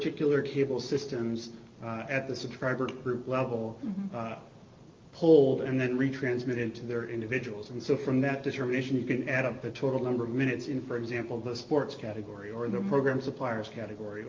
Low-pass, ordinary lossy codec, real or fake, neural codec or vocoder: 7.2 kHz; Opus, 24 kbps; real; none